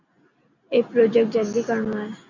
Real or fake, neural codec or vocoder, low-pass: real; none; 7.2 kHz